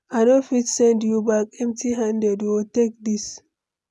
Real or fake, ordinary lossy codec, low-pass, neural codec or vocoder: real; none; none; none